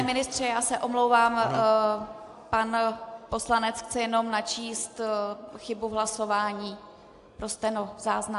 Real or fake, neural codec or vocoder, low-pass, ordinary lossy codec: real; none; 10.8 kHz; AAC, 64 kbps